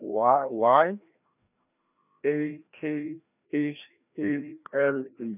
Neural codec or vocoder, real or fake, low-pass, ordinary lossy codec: codec, 16 kHz, 1 kbps, FreqCodec, larger model; fake; 3.6 kHz; none